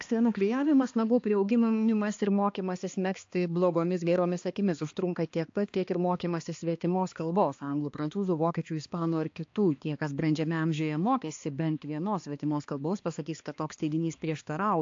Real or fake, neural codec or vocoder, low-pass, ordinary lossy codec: fake; codec, 16 kHz, 2 kbps, X-Codec, HuBERT features, trained on balanced general audio; 7.2 kHz; AAC, 48 kbps